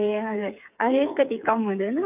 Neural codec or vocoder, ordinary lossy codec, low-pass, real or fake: codec, 16 kHz, 8 kbps, FreqCodec, smaller model; none; 3.6 kHz; fake